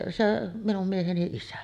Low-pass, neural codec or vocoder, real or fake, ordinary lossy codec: 14.4 kHz; none; real; none